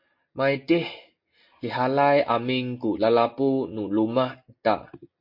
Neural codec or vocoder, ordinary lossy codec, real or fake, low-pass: none; MP3, 32 kbps; real; 5.4 kHz